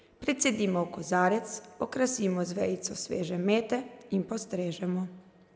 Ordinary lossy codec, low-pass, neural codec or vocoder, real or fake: none; none; none; real